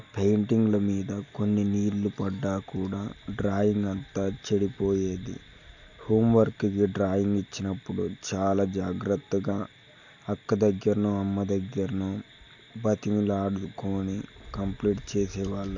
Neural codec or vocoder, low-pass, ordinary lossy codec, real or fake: none; 7.2 kHz; none; real